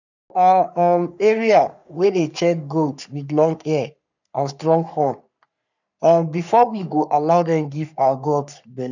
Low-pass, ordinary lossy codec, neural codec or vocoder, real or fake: 7.2 kHz; none; codec, 44.1 kHz, 3.4 kbps, Pupu-Codec; fake